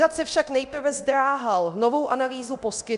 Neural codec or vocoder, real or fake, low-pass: codec, 24 kHz, 0.9 kbps, DualCodec; fake; 10.8 kHz